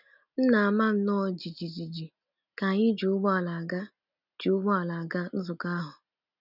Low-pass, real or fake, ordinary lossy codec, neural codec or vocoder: 5.4 kHz; real; none; none